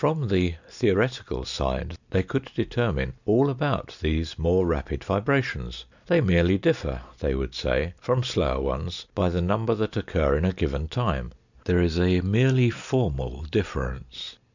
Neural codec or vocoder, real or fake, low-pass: none; real; 7.2 kHz